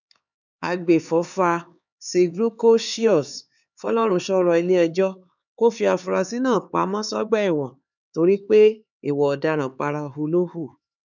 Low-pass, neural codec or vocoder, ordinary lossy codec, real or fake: 7.2 kHz; codec, 16 kHz, 4 kbps, X-Codec, HuBERT features, trained on LibriSpeech; none; fake